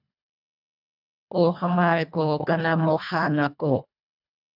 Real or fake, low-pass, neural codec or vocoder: fake; 5.4 kHz; codec, 24 kHz, 1.5 kbps, HILCodec